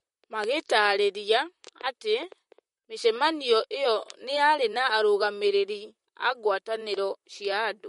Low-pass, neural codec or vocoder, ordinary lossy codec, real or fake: 19.8 kHz; vocoder, 44.1 kHz, 128 mel bands, Pupu-Vocoder; MP3, 48 kbps; fake